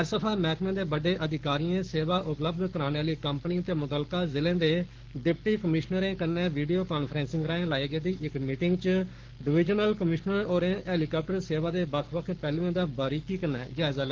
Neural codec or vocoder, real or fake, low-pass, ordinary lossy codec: codec, 44.1 kHz, 7.8 kbps, Pupu-Codec; fake; 7.2 kHz; Opus, 16 kbps